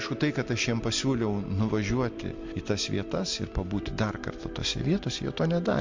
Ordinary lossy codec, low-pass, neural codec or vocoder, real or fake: MP3, 48 kbps; 7.2 kHz; none; real